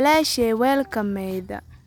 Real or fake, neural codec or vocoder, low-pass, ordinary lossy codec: real; none; none; none